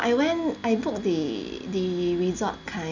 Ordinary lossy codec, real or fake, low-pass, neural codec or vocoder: none; real; 7.2 kHz; none